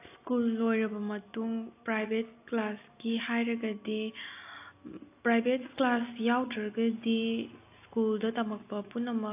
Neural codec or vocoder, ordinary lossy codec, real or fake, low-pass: none; AAC, 24 kbps; real; 3.6 kHz